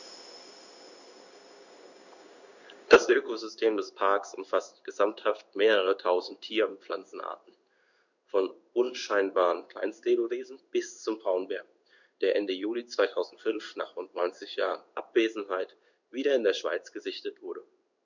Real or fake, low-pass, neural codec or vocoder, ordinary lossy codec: fake; 7.2 kHz; codec, 16 kHz in and 24 kHz out, 1 kbps, XY-Tokenizer; none